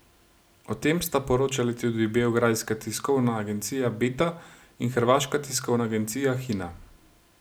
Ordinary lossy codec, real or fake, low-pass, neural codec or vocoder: none; real; none; none